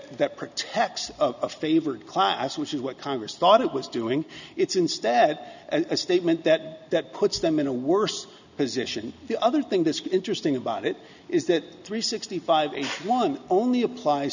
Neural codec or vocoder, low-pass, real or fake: none; 7.2 kHz; real